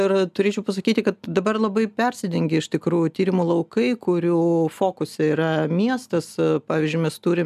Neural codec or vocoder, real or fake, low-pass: none; real; 14.4 kHz